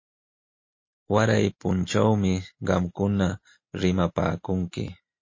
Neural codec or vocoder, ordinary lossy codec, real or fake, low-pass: none; MP3, 32 kbps; real; 7.2 kHz